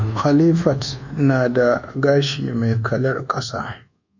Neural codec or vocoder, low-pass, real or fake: codec, 24 kHz, 1.2 kbps, DualCodec; 7.2 kHz; fake